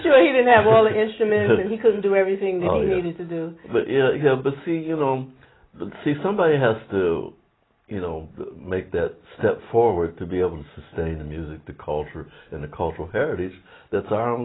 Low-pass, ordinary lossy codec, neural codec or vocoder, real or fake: 7.2 kHz; AAC, 16 kbps; none; real